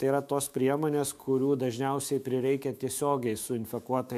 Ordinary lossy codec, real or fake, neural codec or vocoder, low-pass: MP3, 96 kbps; fake; autoencoder, 48 kHz, 128 numbers a frame, DAC-VAE, trained on Japanese speech; 14.4 kHz